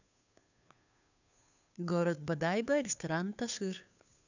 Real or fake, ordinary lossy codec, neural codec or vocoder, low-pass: fake; none; codec, 16 kHz, 2 kbps, FunCodec, trained on Chinese and English, 25 frames a second; 7.2 kHz